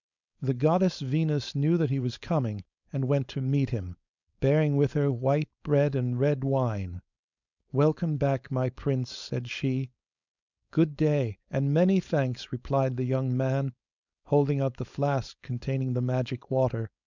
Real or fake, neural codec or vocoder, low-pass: fake; codec, 16 kHz, 4.8 kbps, FACodec; 7.2 kHz